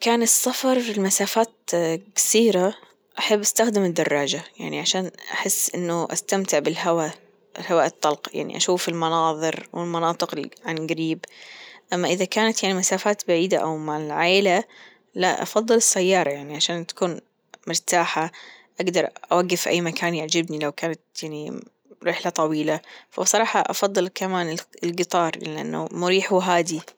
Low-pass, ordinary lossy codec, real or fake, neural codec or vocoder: none; none; real; none